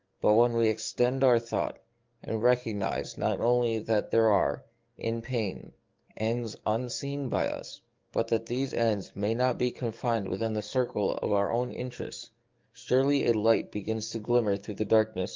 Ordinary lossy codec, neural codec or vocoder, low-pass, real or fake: Opus, 32 kbps; codec, 44.1 kHz, 7.8 kbps, DAC; 7.2 kHz; fake